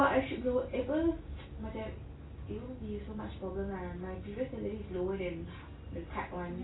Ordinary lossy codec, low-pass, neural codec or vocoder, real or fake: AAC, 16 kbps; 7.2 kHz; none; real